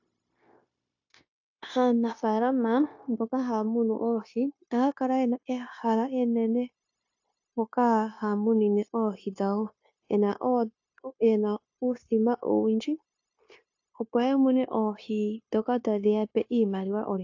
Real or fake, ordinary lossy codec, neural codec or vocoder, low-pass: fake; AAC, 48 kbps; codec, 16 kHz, 0.9 kbps, LongCat-Audio-Codec; 7.2 kHz